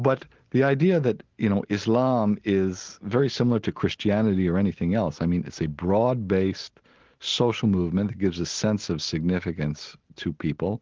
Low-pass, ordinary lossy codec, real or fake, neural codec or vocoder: 7.2 kHz; Opus, 16 kbps; real; none